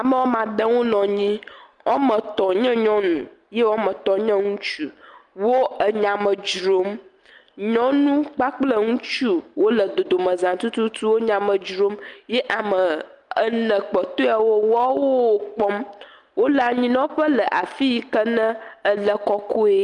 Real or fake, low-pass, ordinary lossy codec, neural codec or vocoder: real; 10.8 kHz; Opus, 32 kbps; none